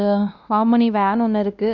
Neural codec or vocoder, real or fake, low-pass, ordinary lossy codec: codec, 16 kHz, 1 kbps, X-Codec, WavLM features, trained on Multilingual LibriSpeech; fake; 7.2 kHz; none